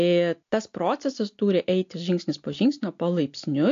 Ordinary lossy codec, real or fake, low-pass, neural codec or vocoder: AAC, 48 kbps; real; 7.2 kHz; none